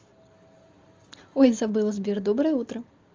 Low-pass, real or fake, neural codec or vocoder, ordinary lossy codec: 7.2 kHz; real; none; Opus, 32 kbps